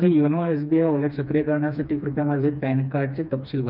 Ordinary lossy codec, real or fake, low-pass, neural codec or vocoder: none; fake; 5.4 kHz; codec, 16 kHz, 2 kbps, FreqCodec, smaller model